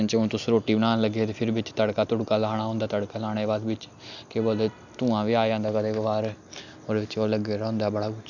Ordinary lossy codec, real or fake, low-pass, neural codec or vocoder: none; real; 7.2 kHz; none